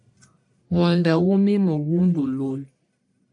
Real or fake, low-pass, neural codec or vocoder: fake; 10.8 kHz; codec, 44.1 kHz, 1.7 kbps, Pupu-Codec